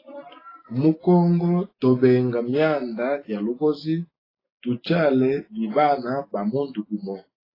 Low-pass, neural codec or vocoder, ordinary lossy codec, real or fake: 5.4 kHz; none; AAC, 24 kbps; real